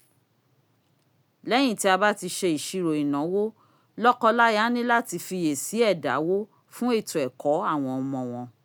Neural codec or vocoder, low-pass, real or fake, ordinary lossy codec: none; none; real; none